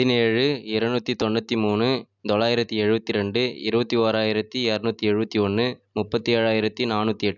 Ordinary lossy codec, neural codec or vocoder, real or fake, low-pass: none; none; real; 7.2 kHz